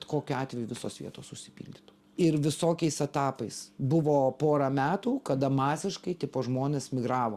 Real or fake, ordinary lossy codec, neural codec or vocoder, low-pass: real; Opus, 64 kbps; none; 14.4 kHz